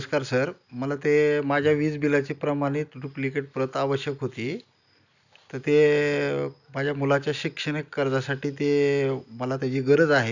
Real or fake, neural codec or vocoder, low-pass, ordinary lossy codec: real; none; 7.2 kHz; none